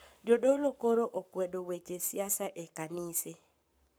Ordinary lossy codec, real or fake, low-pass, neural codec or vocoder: none; fake; none; codec, 44.1 kHz, 7.8 kbps, Pupu-Codec